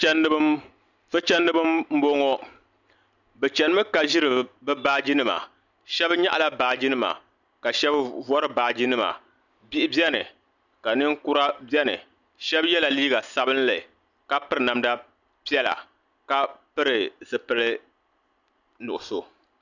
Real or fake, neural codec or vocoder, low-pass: real; none; 7.2 kHz